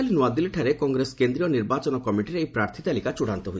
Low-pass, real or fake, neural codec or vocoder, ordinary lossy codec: none; real; none; none